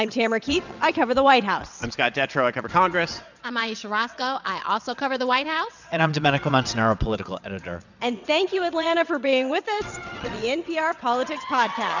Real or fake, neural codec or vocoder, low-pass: fake; vocoder, 22.05 kHz, 80 mel bands, WaveNeXt; 7.2 kHz